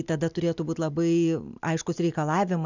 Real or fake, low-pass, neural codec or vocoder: real; 7.2 kHz; none